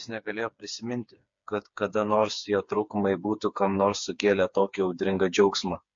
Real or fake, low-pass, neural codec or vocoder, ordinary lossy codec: fake; 7.2 kHz; codec, 16 kHz, 4 kbps, FreqCodec, smaller model; MP3, 48 kbps